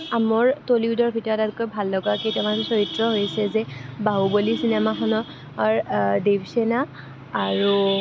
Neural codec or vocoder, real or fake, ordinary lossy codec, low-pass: none; real; none; none